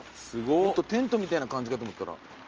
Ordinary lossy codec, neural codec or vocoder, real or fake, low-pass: Opus, 24 kbps; none; real; 7.2 kHz